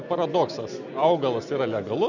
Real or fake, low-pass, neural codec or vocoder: real; 7.2 kHz; none